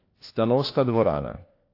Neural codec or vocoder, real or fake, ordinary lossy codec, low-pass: codec, 16 kHz, 1 kbps, FunCodec, trained on LibriTTS, 50 frames a second; fake; AAC, 32 kbps; 5.4 kHz